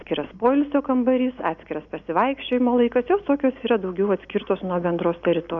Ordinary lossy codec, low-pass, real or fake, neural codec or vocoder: MP3, 96 kbps; 7.2 kHz; real; none